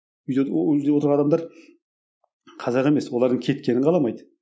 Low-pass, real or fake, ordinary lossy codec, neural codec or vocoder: none; real; none; none